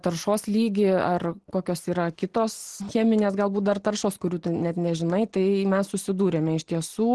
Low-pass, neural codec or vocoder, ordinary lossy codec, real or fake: 10.8 kHz; none; Opus, 16 kbps; real